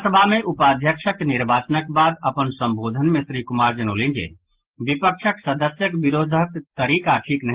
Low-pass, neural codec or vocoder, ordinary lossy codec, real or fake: 3.6 kHz; none; Opus, 16 kbps; real